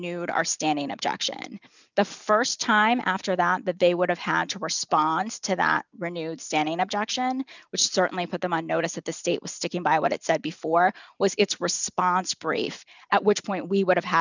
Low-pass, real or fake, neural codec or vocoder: 7.2 kHz; real; none